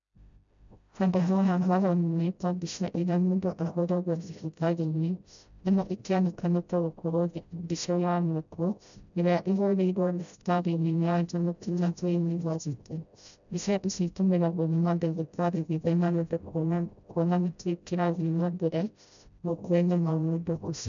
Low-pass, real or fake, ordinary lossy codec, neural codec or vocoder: 7.2 kHz; fake; MP3, 96 kbps; codec, 16 kHz, 0.5 kbps, FreqCodec, smaller model